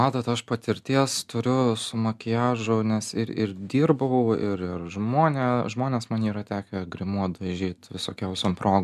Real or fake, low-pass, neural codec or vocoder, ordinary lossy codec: real; 14.4 kHz; none; MP3, 96 kbps